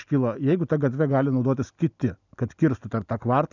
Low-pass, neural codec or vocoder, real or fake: 7.2 kHz; none; real